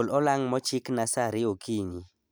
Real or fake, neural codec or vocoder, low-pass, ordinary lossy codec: real; none; none; none